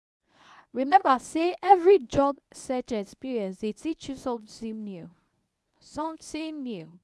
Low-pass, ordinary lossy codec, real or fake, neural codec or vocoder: none; none; fake; codec, 24 kHz, 0.9 kbps, WavTokenizer, medium speech release version 1